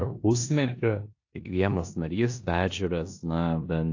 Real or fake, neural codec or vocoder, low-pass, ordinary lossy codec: fake; codec, 16 kHz in and 24 kHz out, 0.9 kbps, LongCat-Audio-Codec, four codebook decoder; 7.2 kHz; AAC, 48 kbps